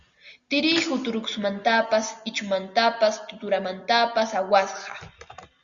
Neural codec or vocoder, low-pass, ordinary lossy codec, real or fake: none; 7.2 kHz; Opus, 64 kbps; real